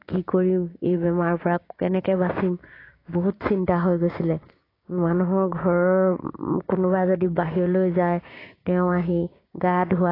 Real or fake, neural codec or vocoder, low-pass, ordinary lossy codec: fake; codec, 16 kHz, 6 kbps, DAC; 5.4 kHz; AAC, 24 kbps